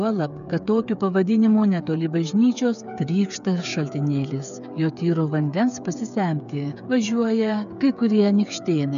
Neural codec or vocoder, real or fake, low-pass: codec, 16 kHz, 8 kbps, FreqCodec, smaller model; fake; 7.2 kHz